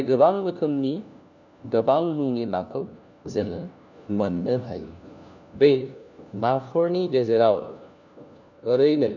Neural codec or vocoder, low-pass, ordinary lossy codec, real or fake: codec, 16 kHz, 1 kbps, FunCodec, trained on LibriTTS, 50 frames a second; 7.2 kHz; none; fake